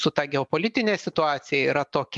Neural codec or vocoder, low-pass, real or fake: none; 10.8 kHz; real